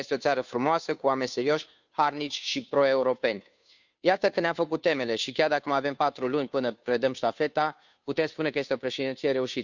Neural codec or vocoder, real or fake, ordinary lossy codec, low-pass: codec, 16 kHz, 2 kbps, FunCodec, trained on Chinese and English, 25 frames a second; fake; none; 7.2 kHz